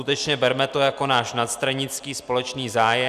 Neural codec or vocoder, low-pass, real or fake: none; 14.4 kHz; real